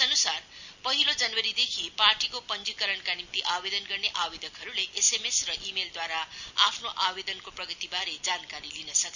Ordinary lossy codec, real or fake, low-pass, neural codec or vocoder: none; real; 7.2 kHz; none